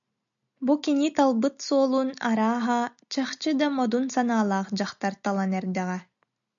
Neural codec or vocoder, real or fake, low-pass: none; real; 7.2 kHz